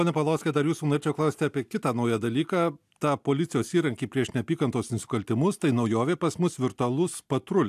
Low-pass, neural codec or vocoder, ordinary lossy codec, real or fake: 14.4 kHz; none; AAC, 96 kbps; real